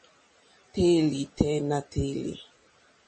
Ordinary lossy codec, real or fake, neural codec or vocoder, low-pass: MP3, 32 kbps; real; none; 10.8 kHz